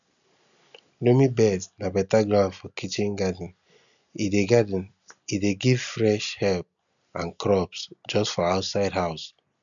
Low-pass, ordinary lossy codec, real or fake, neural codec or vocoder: 7.2 kHz; none; real; none